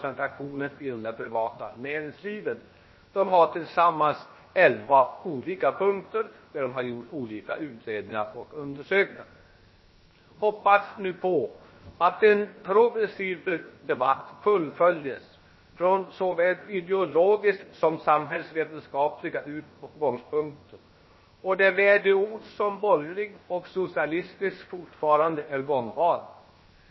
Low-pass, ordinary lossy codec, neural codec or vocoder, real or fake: 7.2 kHz; MP3, 24 kbps; codec, 16 kHz, 0.7 kbps, FocalCodec; fake